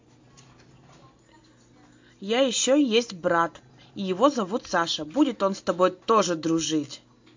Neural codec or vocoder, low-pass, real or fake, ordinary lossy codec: none; 7.2 kHz; real; MP3, 48 kbps